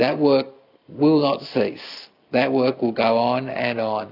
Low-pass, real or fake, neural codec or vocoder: 5.4 kHz; real; none